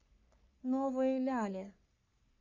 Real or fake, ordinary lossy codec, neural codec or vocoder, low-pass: fake; Opus, 64 kbps; codec, 44.1 kHz, 3.4 kbps, Pupu-Codec; 7.2 kHz